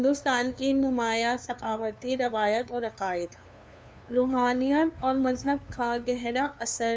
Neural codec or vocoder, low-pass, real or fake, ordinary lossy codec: codec, 16 kHz, 2 kbps, FunCodec, trained on LibriTTS, 25 frames a second; none; fake; none